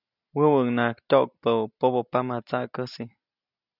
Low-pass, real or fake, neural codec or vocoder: 5.4 kHz; real; none